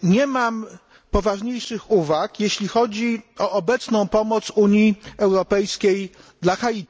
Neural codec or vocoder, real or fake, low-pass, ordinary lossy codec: none; real; none; none